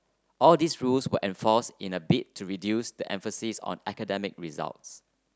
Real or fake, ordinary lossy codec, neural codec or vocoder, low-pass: real; none; none; none